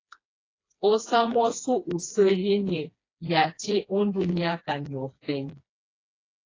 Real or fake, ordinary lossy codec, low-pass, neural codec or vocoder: fake; AAC, 32 kbps; 7.2 kHz; codec, 16 kHz, 2 kbps, FreqCodec, smaller model